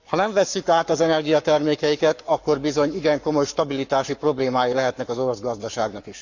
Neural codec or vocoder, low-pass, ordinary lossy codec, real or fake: codec, 44.1 kHz, 7.8 kbps, Pupu-Codec; 7.2 kHz; none; fake